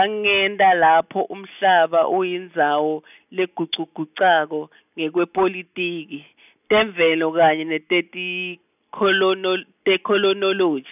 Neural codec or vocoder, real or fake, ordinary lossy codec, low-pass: none; real; none; 3.6 kHz